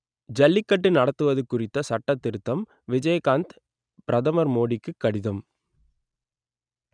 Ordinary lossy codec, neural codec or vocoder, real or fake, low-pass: none; none; real; 9.9 kHz